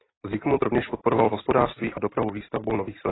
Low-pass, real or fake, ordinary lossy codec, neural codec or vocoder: 7.2 kHz; real; AAC, 16 kbps; none